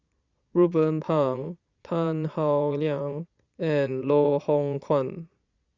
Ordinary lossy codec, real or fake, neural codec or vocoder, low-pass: Opus, 64 kbps; fake; vocoder, 22.05 kHz, 80 mel bands, Vocos; 7.2 kHz